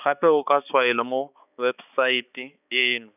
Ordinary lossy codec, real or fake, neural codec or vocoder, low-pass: none; fake; codec, 16 kHz, 4 kbps, X-Codec, HuBERT features, trained on balanced general audio; 3.6 kHz